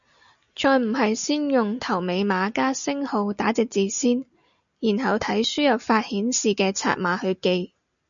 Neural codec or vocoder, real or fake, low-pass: none; real; 7.2 kHz